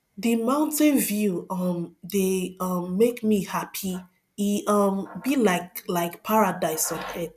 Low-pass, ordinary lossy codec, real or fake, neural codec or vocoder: 14.4 kHz; none; real; none